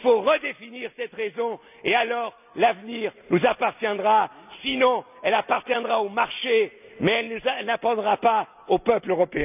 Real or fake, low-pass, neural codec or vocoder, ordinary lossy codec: real; 3.6 kHz; none; none